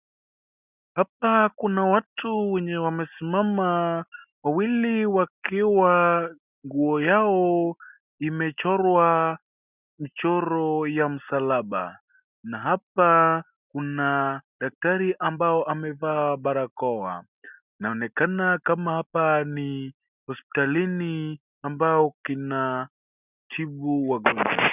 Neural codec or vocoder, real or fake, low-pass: none; real; 3.6 kHz